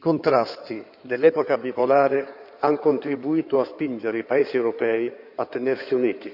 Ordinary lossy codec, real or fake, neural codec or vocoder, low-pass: none; fake; codec, 16 kHz in and 24 kHz out, 2.2 kbps, FireRedTTS-2 codec; 5.4 kHz